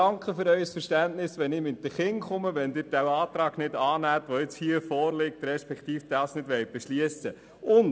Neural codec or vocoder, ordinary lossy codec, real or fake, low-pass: none; none; real; none